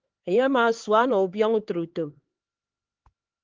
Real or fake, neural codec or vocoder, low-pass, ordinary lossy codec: fake; codec, 16 kHz, 4 kbps, X-Codec, HuBERT features, trained on LibriSpeech; 7.2 kHz; Opus, 16 kbps